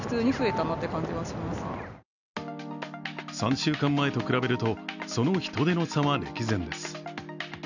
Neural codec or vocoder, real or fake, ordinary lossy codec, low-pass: none; real; none; 7.2 kHz